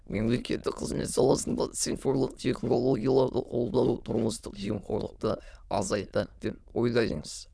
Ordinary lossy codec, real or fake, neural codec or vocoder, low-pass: none; fake; autoencoder, 22.05 kHz, a latent of 192 numbers a frame, VITS, trained on many speakers; none